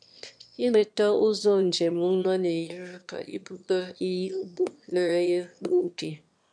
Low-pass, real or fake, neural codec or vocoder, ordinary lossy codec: 9.9 kHz; fake; autoencoder, 22.05 kHz, a latent of 192 numbers a frame, VITS, trained on one speaker; MP3, 64 kbps